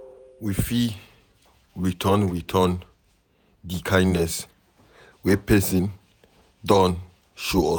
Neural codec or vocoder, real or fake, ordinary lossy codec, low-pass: vocoder, 48 kHz, 128 mel bands, Vocos; fake; none; none